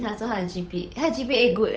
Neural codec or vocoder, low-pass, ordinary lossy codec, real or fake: codec, 16 kHz, 8 kbps, FunCodec, trained on Chinese and English, 25 frames a second; none; none; fake